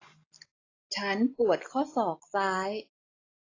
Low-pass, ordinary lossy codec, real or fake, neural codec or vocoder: 7.2 kHz; AAC, 32 kbps; real; none